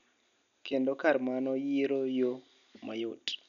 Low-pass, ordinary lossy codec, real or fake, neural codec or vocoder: 7.2 kHz; none; real; none